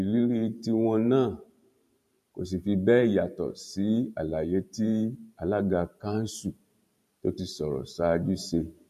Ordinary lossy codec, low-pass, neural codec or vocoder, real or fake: MP3, 64 kbps; 14.4 kHz; vocoder, 44.1 kHz, 128 mel bands every 512 samples, BigVGAN v2; fake